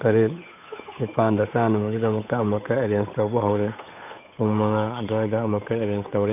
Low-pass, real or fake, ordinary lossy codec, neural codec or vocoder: 3.6 kHz; fake; none; codec, 16 kHz, 8 kbps, FunCodec, trained on Chinese and English, 25 frames a second